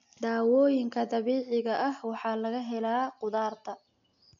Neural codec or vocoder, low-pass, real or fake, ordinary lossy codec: none; 7.2 kHz; real; none